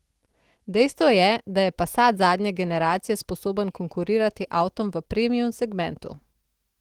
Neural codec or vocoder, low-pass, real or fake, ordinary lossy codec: autoencoder, 48 kHz, 128 numbers a frame, DAC-VAE, trained on Japanese speech; 19.8 kHz; fake; Opus, 16 kbps